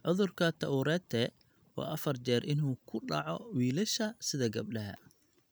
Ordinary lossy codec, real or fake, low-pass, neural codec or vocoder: none; real; none; none